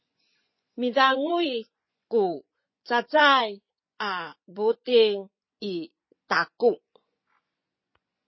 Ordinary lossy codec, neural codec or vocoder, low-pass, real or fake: MP3, 24 kbps; vocoder, 24 kHz, 100 mel bands, Vocos; 7.2 kHz; fake